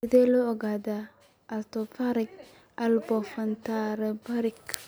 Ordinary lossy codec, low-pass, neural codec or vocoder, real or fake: none; none; none; real